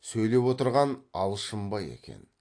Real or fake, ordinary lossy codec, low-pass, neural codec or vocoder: real; AAC, 48 kbps; 9.9 kHz; none